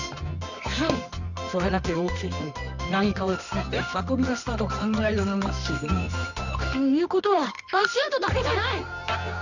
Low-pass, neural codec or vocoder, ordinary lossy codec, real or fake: 7.2 kHz; codec, 24 kHz, 0.9 kbps, WavTokenizer, medium music audio release; none; fake